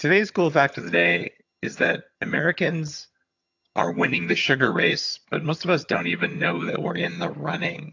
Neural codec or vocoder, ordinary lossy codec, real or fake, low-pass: vocoder, 22.05 kHz, 80 mel bands, HiFi-GAN; AAC, 48 kbps; fake; 7.2 kHz